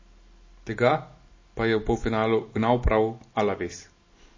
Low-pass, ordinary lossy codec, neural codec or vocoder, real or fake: 7.2 kHz; MP3, 32 kbps; none; real